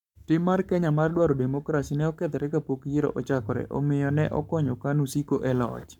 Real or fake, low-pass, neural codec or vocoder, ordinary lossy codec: fake; 19.8 kHz; codec, 44.1 kHz, 7.8 kbps, Pupu-Codec; none